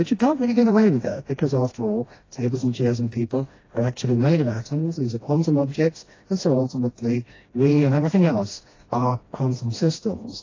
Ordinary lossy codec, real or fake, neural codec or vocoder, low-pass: AAC, 32 kbps; fake; codec, 16 kHz, 1 kbps, FreqCodec, smaller model; 7.2 kHz